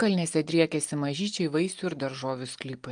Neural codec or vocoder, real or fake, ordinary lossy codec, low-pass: none; real; Opus, 32 kbps; 9.9 kHz